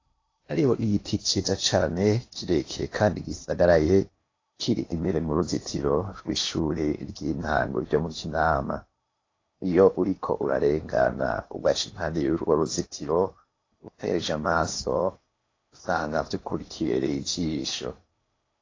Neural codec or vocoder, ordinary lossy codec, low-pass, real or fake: codec, 16 kHz in and 24 kHz out, 0.8 kbps, FocalCodec, streaming, 65536 codes; AAC, 32 kbps; 7.2 kHz; fake